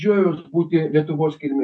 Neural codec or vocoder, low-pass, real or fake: none; 9.9 kHz; real